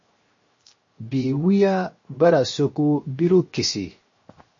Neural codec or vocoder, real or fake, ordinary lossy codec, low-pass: codec, 16 kHz, 0.7 kbps, FocalCodec; fake; MP3, 32 kbps; 7.2 kHz